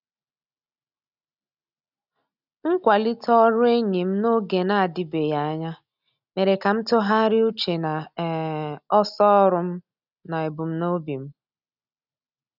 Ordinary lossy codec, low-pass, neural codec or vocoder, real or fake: none; 5.4 kHz; none; real